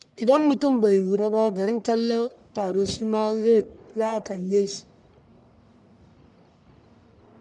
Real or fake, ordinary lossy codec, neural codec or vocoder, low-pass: fake; none; codec, 44.1 kHz, 1.7 kbps, Pupu-Codec; 10.8 kHz